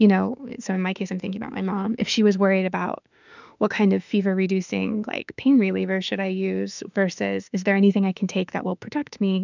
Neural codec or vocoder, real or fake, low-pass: autoencoder, 48 kHz, 32 numbers a frame, DAC-VAE, trained on Japanese speech; fake; 7.2 kHz